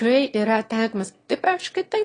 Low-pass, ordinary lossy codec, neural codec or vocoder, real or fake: 9.9 kHz; AAC, 32 kbps; autoencoder, 22.05 kHz, a latent of 192 numbers a frame, VITS, trained on one speaker; fake